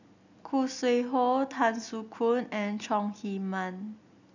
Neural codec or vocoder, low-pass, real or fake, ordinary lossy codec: none; 7.2 kHz; real; none